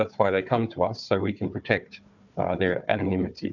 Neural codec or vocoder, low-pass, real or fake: codec, 16 kHz, 4 kbps, FunCodec, trained on Chinese and English, 50 frames a second; 7.2 kHz; fake